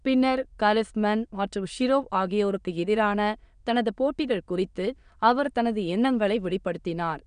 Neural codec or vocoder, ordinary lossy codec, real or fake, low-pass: autoencoder, 22.05 kHz, a latent of 192 numbers a frame, VITS, trained on many speakers; none; fake; 9.9 kHz